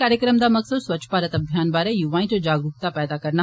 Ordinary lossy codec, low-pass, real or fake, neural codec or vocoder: none; none; real; none